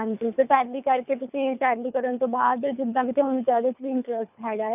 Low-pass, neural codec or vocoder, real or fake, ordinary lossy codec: 3.6 kHz; codec, 24 kHz, 3 kbps, HILCodec; fake; none